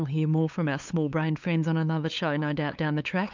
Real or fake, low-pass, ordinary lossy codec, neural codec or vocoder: fake; 7.2 kHz; MP3, 64 kbps; codec, 16 kHz, 8 kbps, FunCodec, trained on LibriTTS, 25 frames a second